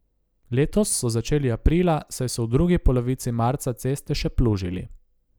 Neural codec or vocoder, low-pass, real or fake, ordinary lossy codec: none; none; real; none